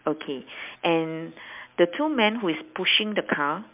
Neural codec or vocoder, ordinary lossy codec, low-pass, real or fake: none; MP3, 32 kbps; 3.6 kHz; real